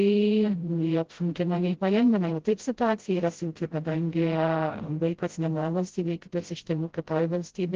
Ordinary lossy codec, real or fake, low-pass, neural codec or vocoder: Opus, 16 kbps; fake; 7.2 kHz; codec, 16 kHz, 0.5 kbps, FreqCodec, smaller model